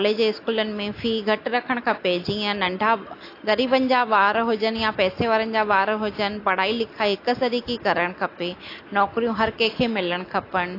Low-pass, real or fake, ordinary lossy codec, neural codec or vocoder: 5.4 kHz; real; AAC, 32 kbps; none